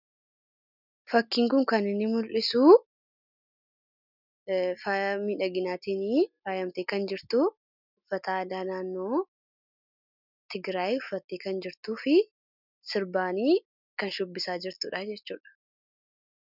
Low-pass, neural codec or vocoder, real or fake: 5.4 kHz; none; real